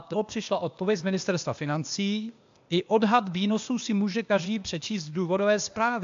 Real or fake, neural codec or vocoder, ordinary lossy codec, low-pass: fake; codec, 16 kHz, 0.8 kbps, ZipCodec; AAC, 64 kbps; 7.2 kHz